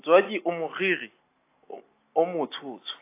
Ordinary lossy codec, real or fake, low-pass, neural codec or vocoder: AAC, 24 kbps; real; 3.6 kHz; none